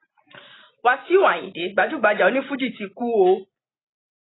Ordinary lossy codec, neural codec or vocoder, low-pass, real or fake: AAC, 16 kbps; none; 7.2 kHz; real